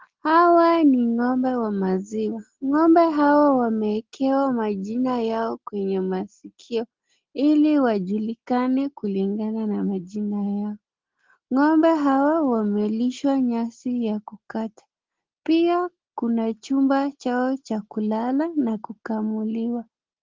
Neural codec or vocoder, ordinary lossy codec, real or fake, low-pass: none; Opus, 16 kbps; real; 7.2 kHz